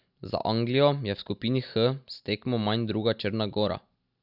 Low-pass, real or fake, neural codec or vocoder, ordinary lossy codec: 5.4 kHz; real; none; none